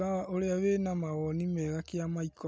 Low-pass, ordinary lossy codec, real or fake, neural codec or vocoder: none; none; real; none